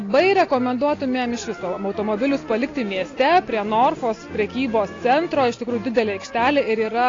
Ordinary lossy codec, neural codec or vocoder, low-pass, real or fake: AAC, 32 kbps; none; 7.2 kHz; real